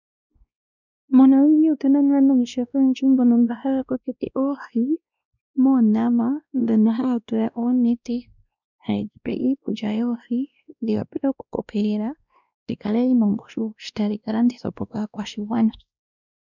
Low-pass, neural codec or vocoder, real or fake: 7.2 kHz; codec, 16 kHz, 1 kbps, X-Codec, WavLM features, trained on Multilingual LibriSpeech; fake